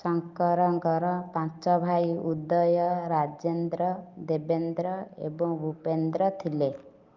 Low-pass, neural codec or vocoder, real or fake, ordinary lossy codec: 7.2 kHz; none; real; Opus, 24 kbps